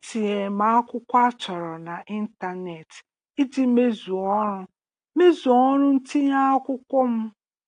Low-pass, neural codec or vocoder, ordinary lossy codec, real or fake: 9.9 kHz; none; AAC, 48 kbps; real